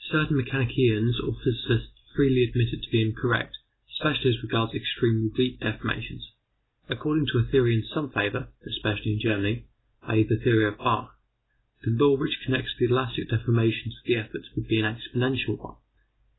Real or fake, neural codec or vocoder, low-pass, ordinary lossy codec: real; none; 7.2 kHz; AAC, 16 kbps